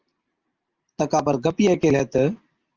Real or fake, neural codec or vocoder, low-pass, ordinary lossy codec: real; none; 7.2 kHz; Opus, 24 kbps